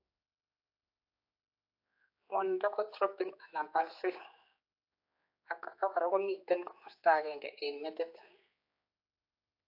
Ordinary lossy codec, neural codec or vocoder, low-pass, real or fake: AAC, 32 kbps; codec, 16 kHz, 4 kbps, X-Codec, HuBERT features, trained on general audio; 5.4 kHz; fake